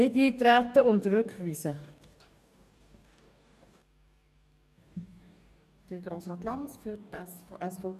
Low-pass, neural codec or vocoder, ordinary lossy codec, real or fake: 14.4 kHz; codec, 44.1 kHz, 2.6 kbps, DAC; none; fake